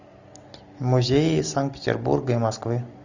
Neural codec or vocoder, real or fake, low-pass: none; real; 7.2 kHz